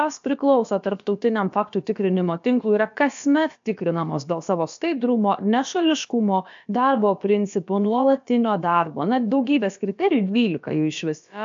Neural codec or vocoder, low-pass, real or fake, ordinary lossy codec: codec, 16 kHz, about 1 kbps, DyCAST, with the encoder's durations; 7.2 kHz; fake; AAC, 64 kbps